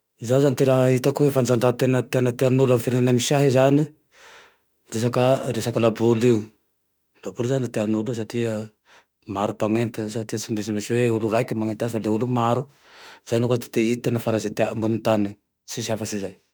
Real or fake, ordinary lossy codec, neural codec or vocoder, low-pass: fake; none; autoencoder, 48 kHz, 32 numbers a frame, DAC-VAE, trained on Japanese speech; none